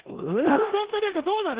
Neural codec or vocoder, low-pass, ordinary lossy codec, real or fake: codec, 16 kHz in and 24 kHz out, 0.9 kbps, LongCat-Audio-Codec, four codebook decoder; 3.6 kHz; Opus, 16 kbps; fake